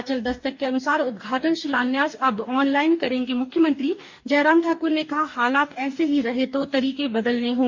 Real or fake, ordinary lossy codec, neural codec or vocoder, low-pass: fake; MP3, 64 kbps; codec, 44.1 kHz, 2.6 kbps, DAC; 7.2 kHz